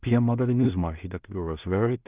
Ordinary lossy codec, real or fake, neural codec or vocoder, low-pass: Opus, 24 kbps; fake; codec, 16 kHz in and 24 kHz out, 0.4 kbps, LongCat-Audio-Codec, two codebook decoder; 3.6 kHz